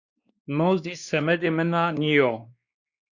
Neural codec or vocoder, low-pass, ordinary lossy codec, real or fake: codec, 16 kHz, 2 kbps, X-Codec, WavLM features, trained on Multilingual LibriSpeech; 7.2 kHz; Opus, 64 kbps; fake